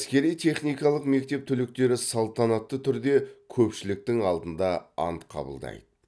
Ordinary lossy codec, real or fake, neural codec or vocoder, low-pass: none; real; none; none